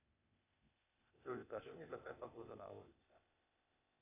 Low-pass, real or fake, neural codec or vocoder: 3.6 kHz; fake; codec, 16 kHz, 0.8 kbps, ZipCodec